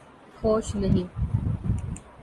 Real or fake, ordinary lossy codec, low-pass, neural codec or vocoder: real; Opus, 24 kbps; 10.8 kHz; none